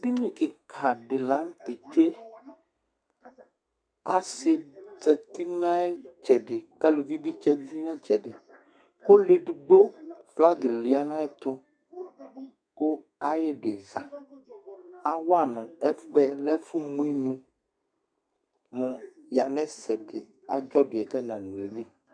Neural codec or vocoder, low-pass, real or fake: codec, 32 kHz, 1.9 kbps, SNAC; 9.9 kHz; fake